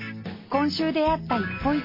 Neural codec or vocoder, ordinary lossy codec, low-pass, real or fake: none; MP3, 24 kbps; 5.4 kHz; real